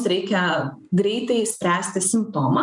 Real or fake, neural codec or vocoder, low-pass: real; none; 10.8 kHz